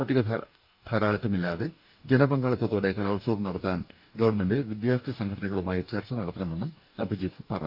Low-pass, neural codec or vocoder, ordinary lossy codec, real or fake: 5.4 kHz; codec, 44.1 kHz, 2.6 kbps, DAC; none; fake